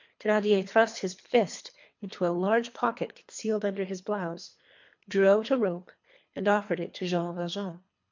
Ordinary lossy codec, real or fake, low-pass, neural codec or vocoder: MP3, 48 kbps; fake; 7.2 kHz; codec, 24 kHz, 3 kbps, HILCodec